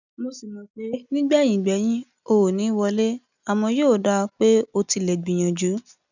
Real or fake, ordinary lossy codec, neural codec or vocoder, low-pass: real; none; none; 7.2 kHz